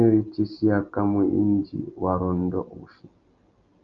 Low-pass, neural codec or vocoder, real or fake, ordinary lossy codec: 7.2 kHz; none; real; Opus, 32 kbps